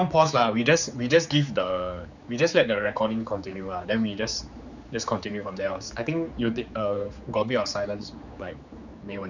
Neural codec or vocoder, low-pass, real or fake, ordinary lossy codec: codec, 16 kHz, 4 kbps, X-Codec, HuBERT features, trained on general audio; 7.2 kHz; fake; none